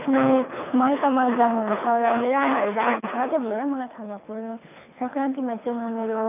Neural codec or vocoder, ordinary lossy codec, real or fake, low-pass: codec, 24 kHz, 3 kbps, HILCodec; none; fake; 3.6 kHz